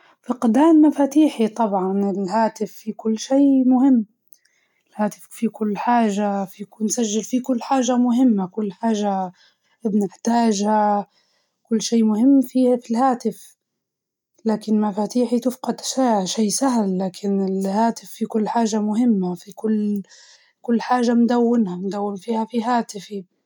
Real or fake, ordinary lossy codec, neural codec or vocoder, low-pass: real; none; none; 19.8 kHz